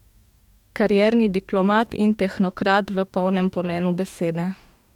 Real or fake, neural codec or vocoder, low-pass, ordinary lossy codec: fake; codec, 44.1 kHz, 2.6 kbps, DAC; 19.8 kHz; none